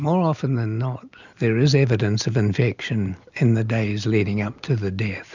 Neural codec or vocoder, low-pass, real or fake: none; 7.2 kHz; real